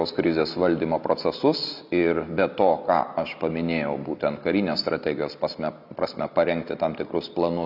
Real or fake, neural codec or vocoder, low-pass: real; none; 5.4 kHz